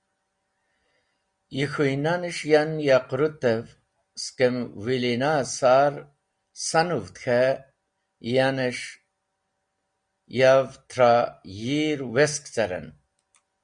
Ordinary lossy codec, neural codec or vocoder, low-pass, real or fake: Opus, 64 kbps; none; 9.9 kHz; real